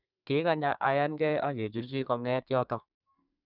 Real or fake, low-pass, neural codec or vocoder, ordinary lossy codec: fake; 5.4 kHz; codec, 32 kHz, 1.9 kbps, SNAC; none